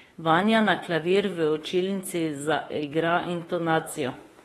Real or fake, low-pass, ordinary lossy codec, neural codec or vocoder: fake; 19.8 kHz; AAC, 32 kbps; autoencoder, 48 kHz, 32 numbers a frame, DAC-VAE, trained on Japanese speech